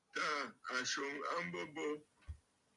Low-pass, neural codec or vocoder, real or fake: 10.8 kHz; none; real